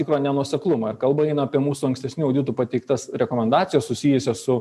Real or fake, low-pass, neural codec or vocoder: real; 14.4 kHz; none